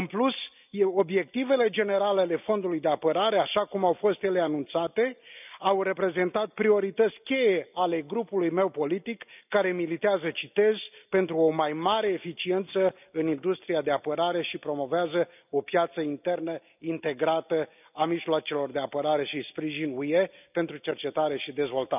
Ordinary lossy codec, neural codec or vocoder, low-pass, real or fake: none; none; 3.6 kHz; real